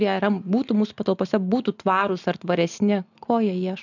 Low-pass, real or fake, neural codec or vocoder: 7.2 kHz; real; none